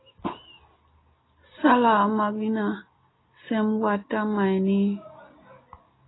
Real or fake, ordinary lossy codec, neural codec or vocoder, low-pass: real; AAC, 16 kbps; none; 7.2 kHz